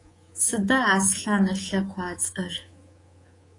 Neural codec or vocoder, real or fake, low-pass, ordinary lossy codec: codec, 24 kHz, 3.1 kbps, DualCodec; fake; 10.8 kHz; AAC, 32 kbps